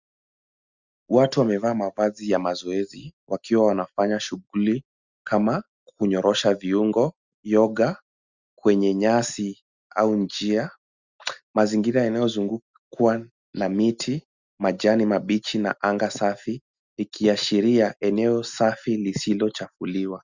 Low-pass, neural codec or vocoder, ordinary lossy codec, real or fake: 7.2 kHz; none; Opus, 64 kbps; real